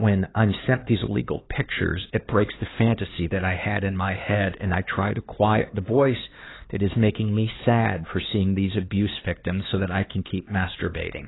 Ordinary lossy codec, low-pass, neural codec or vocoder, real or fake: AAC, 16 kbps; 7.2 kHz; codec, 16 kHz, 4 kbps, X-Codec, HuBERT features, trained on LibriSpeech; fake